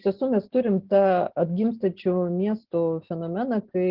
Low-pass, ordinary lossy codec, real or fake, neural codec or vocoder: 5.4 kHz; Opus, 16 kbps; real; none